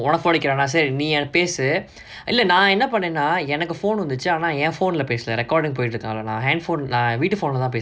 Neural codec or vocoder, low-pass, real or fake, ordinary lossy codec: none; none; real; none